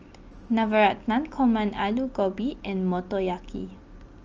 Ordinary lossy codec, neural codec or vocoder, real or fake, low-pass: Opus, 24 kbps; none; real; 7.2 kHz